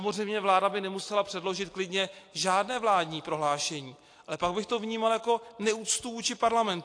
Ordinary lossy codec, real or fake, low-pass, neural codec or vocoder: AAC, 48 kbps; real; 9.9 kHz; none